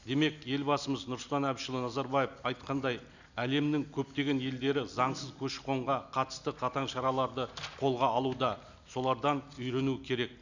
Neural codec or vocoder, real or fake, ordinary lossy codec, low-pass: none; real; none; 7.2 kHz